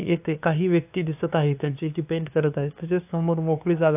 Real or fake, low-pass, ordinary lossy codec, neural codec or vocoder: fake; 3.6 kHz; AAC, 32 kbps; codec, 16 kHz, 2 kbps, FunCodec, trained on LibriTTS, 25 frames a second